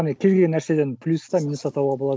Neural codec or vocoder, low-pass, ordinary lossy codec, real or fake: none; none; none; real